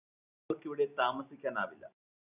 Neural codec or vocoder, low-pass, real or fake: none; 3.6 kHz; real